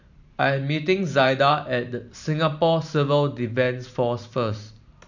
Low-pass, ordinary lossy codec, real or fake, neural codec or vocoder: 7.2 kHz; none; fake; vocoder, 44.1 kHz, 128 mel bands every 512 samples, BigVGAN v2